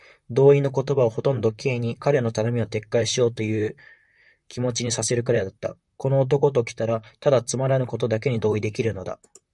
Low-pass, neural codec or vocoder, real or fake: 10.8 kHz; vocoder, 44.1 kHz, 128 mel bands, Pupu-Vocoder; fake